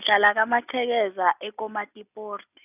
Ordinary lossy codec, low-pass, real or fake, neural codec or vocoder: none; 3.6 kHz; real; none